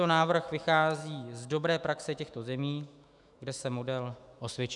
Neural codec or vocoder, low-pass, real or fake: autoencoder, 48 kHz, 128 numbers a frame, DAC-VAE, trained on Japanese speech; 10.8 kHz; fake